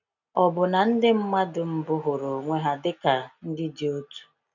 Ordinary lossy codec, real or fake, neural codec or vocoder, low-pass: none; real; none; 7.2 kHz